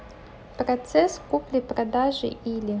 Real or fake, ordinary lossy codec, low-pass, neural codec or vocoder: real; none; none; none